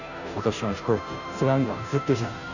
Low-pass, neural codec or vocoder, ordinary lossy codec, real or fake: 7.2 kHz; codec, 16 kHz, 0.5 kbps, FunCodec, trained on Chinese and English, 25 frames a second; none; fake